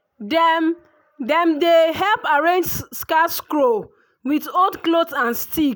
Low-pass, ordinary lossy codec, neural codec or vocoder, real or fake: none; none; none; real